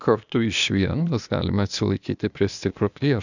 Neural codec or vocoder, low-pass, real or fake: codec, 16 kHz, 0.8 kbps, ZipCodec; 7.2 kHz; fake